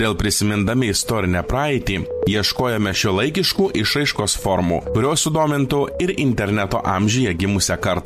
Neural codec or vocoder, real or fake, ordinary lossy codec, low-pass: none; real; MP3, 64 kbps; 14.4 kHz